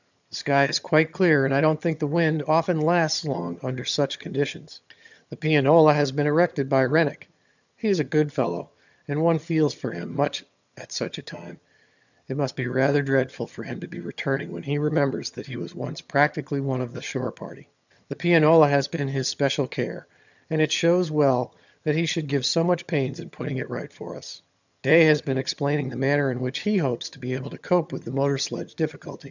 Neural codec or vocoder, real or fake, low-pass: vocoder, 22.05 kHz, 80 mel bands, HiFi-GAN; fake; 7.2 kHz